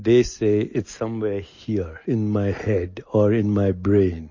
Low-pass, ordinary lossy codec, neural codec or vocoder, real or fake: 7.2 kHz; MP3, 32 kbps; none; real